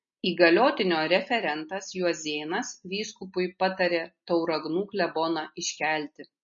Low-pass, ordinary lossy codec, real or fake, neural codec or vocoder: 7.2 kHz; MP3, 32 kbps; real; none